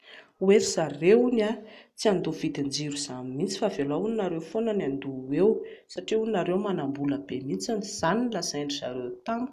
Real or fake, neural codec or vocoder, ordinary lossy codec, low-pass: real; none; none; 14.4 kHz